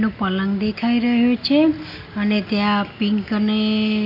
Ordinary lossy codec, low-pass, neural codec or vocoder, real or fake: AAC, 48 kbps; 5.4 kHz; none; real